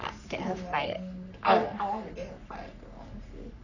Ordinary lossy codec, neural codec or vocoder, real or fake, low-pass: none; codec, 44.1 kHz, 3.4 kbps, Pupu-Codec; fake; 7.2 kHz